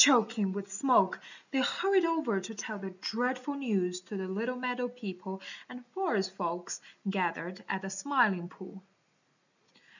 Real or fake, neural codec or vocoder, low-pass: real; none; 7.2 kHz